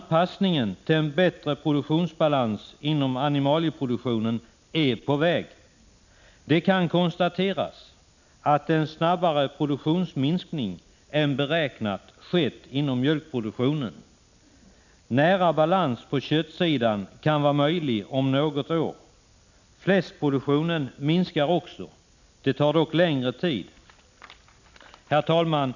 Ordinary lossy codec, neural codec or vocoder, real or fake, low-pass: none; none; real; 7.2 kHz